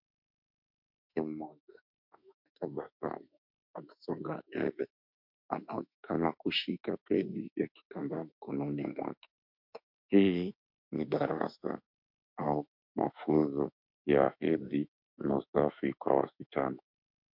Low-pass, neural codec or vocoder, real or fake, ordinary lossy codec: 5.4 kHz; autoencoder, 48 kHz, 32 numbers a frame, DAC-VAE, trained on Japanese speech; fake; MP3, 48 kbps